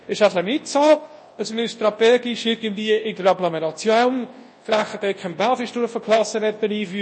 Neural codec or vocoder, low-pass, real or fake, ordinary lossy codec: codec, 24 kHz, 0.9 kbps, WavTokenizer, large speech release; 9.9 kHz; fake; MP3, 32 kbps